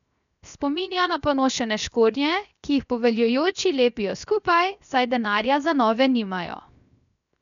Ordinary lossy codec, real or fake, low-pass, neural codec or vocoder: none; fake; 7.2 kHz; codec, 16 kHz, 0.7 kbps, FocalCodec